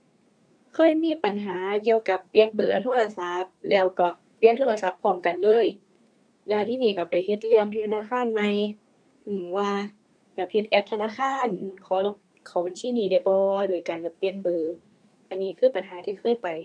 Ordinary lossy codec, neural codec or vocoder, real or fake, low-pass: none; codec, 24 kHz, 1 kbps, SNAC; fake; 9.9 kHz